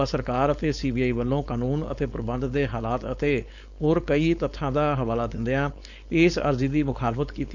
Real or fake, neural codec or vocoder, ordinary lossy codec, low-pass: fake; codec, 16 kHz, 4.8 kbps, FACodec; none; 7.2 kHz